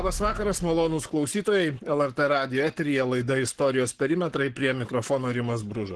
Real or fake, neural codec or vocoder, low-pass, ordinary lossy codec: fake; codec, 44.1 kHz, 7.8 kbps, Pupu-Codec; 10.8 kHz; Opus, 16 kbps